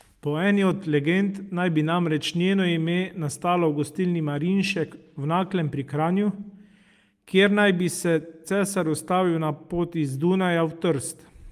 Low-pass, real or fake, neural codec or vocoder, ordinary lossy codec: 14.4 kHz; real; none; Opus, 32 kbps